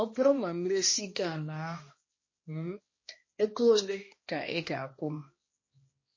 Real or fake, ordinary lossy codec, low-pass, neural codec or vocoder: fake; MP3, 32 kbps; 7.2 kHz; codec, 16 kHz, 1 kbps, X-Codec, HuBERT features, trained on balanced general audio